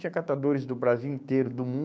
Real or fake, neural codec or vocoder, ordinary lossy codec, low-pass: fake; codec, 16 kHz, 6 kbps, DAC; none; none